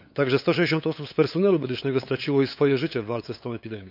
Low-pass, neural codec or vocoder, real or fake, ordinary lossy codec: 5.4 kHz; codec, 16 kHz, 8 kbps, FunCodec, trained on LibriTTS, 25 frames a second; fake; none